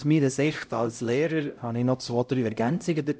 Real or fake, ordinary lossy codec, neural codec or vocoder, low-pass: fake; none; codec, 16 kHz, 0.5 kbps, X-Codec, HuBERT features, trained on LibriSpeech; none